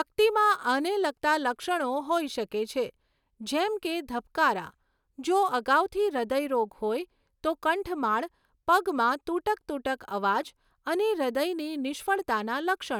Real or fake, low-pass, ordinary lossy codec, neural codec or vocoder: real; 19.8 kHz; none; none